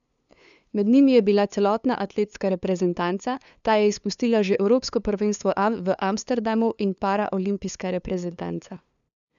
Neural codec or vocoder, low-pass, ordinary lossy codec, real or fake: codec, 16 kHz, 2 kbps, FunCodec, trained on LibriTTS, 25 frames a second; 7.2 kHz; none; fake